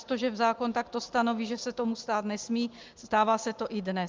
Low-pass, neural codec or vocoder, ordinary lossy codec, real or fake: 7.2 kHz; none; Opus, 32 kbps; real